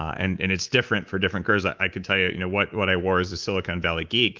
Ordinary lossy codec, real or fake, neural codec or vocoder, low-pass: Opus, 32 kbps; fake; codec, 24 kHz, 3.1 kbps, DualCodec; 7.2 kHz